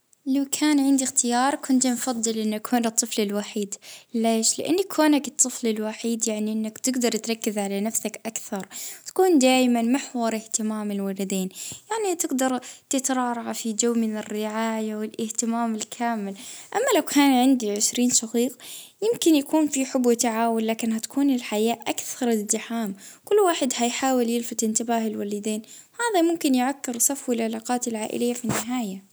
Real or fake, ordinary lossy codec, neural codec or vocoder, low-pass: real; none; none; none